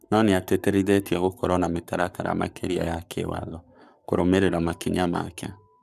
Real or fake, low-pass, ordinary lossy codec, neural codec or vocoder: fake; 14.4 kHz; none; codec, 44.1 kHz, 7.8 kbps, Pupu-Codec